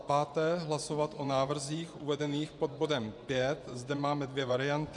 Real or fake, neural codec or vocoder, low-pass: fake; vocoder, 24 kHz, 100 mel bands, Vocos; 10.8 kHz